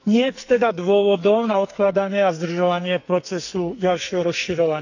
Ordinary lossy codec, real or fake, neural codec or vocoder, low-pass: none; fake; codec, 44.1 kHz, 2.6 kbps, SNAC; 7.2 kHz